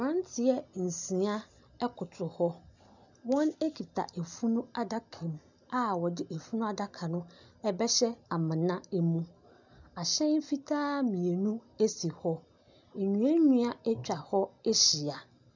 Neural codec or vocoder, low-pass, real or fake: none; 7.2 kHz; real